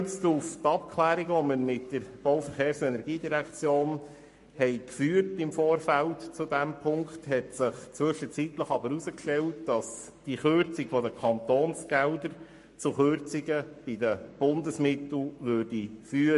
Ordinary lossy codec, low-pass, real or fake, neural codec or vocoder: MP3, 48 kbps; 14.4 kHz; fake; codec, 44.1 kHz, 7.8 kbps, Pupu-Codec